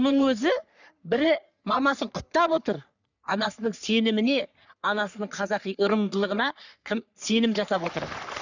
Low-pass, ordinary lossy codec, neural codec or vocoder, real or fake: 7.2 kHz; none; codec, 44.1 kHz, 3.4 kbps, Pupu-Codec; fake